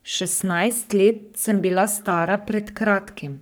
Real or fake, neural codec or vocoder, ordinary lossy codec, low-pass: fake; codec, 44.1 kHz, 3.4 kbps, Pupu-Codec; none; none